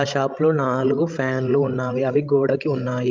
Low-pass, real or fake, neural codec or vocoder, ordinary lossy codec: 7.2 kHz; fake; codec, 16 kHz, 16 kbps, FreqCodec, larger model; Opus, 24 kbps